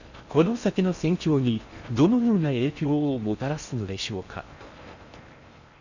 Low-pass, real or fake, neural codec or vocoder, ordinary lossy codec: 7.2 kHz; fake; codec, 16 kHz in and 24 kHz out, 0.6 kbps, FocalCodec, streaming, 4096 codes; none